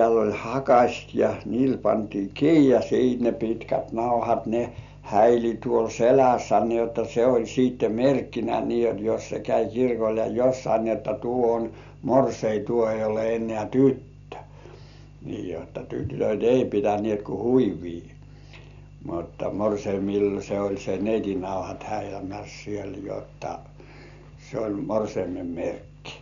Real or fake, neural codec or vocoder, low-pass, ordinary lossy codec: real; none; 7.2 kHz; none